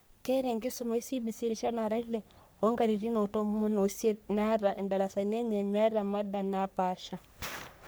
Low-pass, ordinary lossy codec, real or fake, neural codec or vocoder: none; none; fake; codec, 44.1 kHz, 3.4 kbps, Pupu-Codec